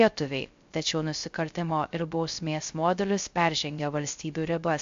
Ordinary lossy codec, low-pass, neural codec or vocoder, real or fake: AAC, 64 kbps; 7.2 kHz; codec, 16 kHz, 0.3 kbps, FocalCodec; fake